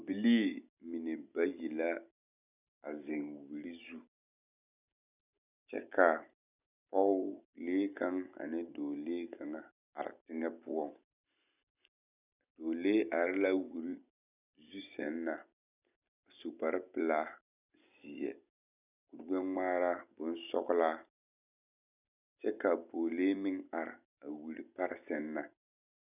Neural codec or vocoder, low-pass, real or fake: none; 3.6 kHz; real